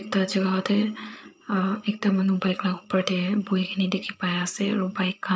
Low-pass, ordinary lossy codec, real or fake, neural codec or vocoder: none; none; fake; codec, 16 kHz, 8 kbps, FreqCodec, larger model